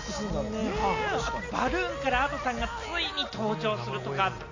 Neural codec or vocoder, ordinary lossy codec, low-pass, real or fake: none; Opus, 64 kbps; 7.2 kHz; real